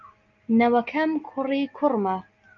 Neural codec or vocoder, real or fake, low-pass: none; real; 7.2 kHz